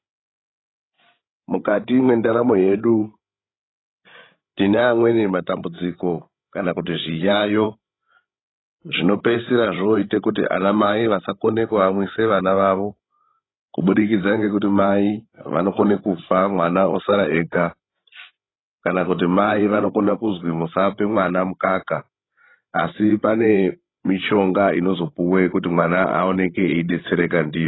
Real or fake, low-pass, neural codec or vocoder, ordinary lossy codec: fake; 7.2 kHz; codec, 16 kHz, 16 kbps, FreqCodec, larger model; AAC, 16 kbps